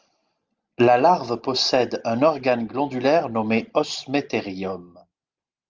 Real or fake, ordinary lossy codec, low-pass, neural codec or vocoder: real; Opus, 24 kbps; 7.2 kHz; none